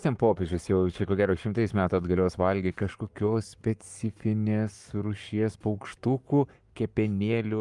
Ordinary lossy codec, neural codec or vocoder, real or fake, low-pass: Opus, 32 kbps; codec, 44.1 kHz, 7.8 kbps, Pupu-Codec; fake; 10.8 kHz